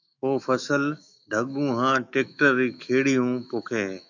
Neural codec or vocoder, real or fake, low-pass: autoencoder, 48 kHz, 128 numbers a frame, DAC-VAE, trained on Japanese speech; fake; 7.2 kHz